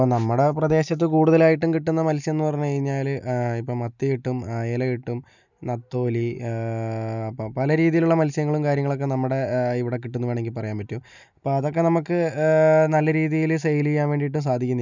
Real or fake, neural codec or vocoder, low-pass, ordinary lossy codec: real; none; 7.2 kHz; none